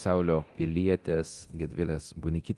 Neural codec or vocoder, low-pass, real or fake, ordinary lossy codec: codec, 24 kHz, 0.9 kbps, DualCodec; 10.8 kHz; fake; Opus, 24 kbps